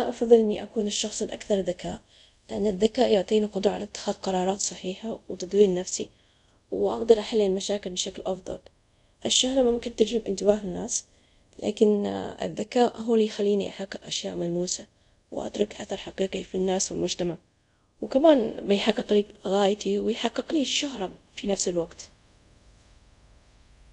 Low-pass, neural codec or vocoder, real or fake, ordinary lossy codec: 10.8 kHz; codec, 24 kHz, 0.5 kbps, DualCodec; fake; none